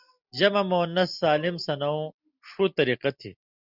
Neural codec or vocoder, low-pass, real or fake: none; 5.4 kHz; real